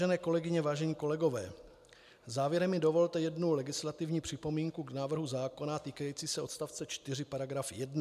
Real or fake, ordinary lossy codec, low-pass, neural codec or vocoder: real; MP3, 96 kbps; 14.4 kHz; none